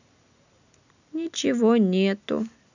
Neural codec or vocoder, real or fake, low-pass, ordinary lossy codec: none; real; 7.2 kHz; none